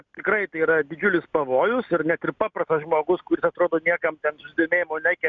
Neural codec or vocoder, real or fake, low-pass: none; real; 7.2 kHz